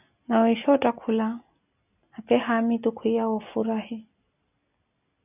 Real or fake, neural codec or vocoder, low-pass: real; none; 3.6 kHz